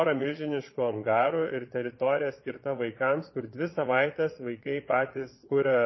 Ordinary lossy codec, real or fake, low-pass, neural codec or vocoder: MP3, 24 kbps; fake; 7.2 kHz; vocoder, 22.05 kHz, 80 mel bands, Vocos